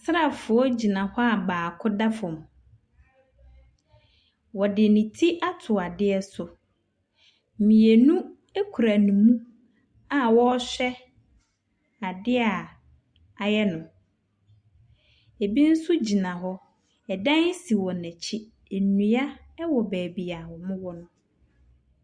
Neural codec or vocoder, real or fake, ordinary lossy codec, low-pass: none; real; Opus, 64 kbps; 9.9 kHz